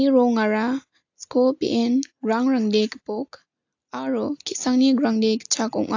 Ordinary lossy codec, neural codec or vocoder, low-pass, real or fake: AAC, 48 kbps; none; 7.2 kHz; real